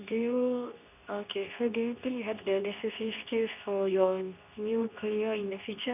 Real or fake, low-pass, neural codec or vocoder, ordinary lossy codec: fake; 3.6 kHz; codec, 24 kHz, 0.9 kbps, WavTokenizer, medium speech release version 2; none